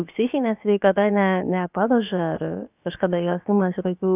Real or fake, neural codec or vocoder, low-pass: fake; codec, 16 kHz, about 1 kbps, DyCAST, with the encoder's durations; 3.6 kHz